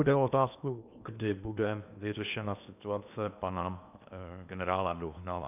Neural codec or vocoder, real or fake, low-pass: codec, 16 kHz in and 24 kHz out, 0.8 kbps, FocalCodec, streaming, 65536 codes; fake; 3.6 kHz